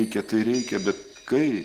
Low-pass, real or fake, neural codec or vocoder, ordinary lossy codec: 14.4 kHz; real; none; Opus, 16 kbps